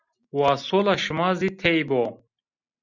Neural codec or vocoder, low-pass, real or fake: none; 7.2 kHz; real